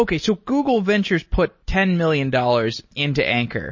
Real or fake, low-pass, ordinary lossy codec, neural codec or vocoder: real; 7.2 kHz; MP3, 32 kbps; none